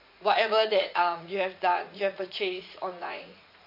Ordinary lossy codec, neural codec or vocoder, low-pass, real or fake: MP3, 32 kbps; vocoder, 44.1 kHz, 80 mel bands, Vocos; 5.4 kHz; fake